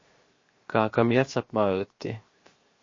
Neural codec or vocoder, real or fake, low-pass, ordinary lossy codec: codec, 16 kHz, 0.7 kbps, FocalCodec; fake; 7.2 kHz; MP3, 32 kbps